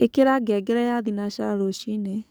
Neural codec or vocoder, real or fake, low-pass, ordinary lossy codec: codec, 44.1 kHz, 7.8 kbps, DAC; fake; none; none